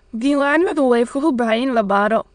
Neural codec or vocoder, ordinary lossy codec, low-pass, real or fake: autoencoder, 22.05 kHz, a latent of 192 numbers a frame, VITS, trained on many speakers; none; 9.9 kHz; fake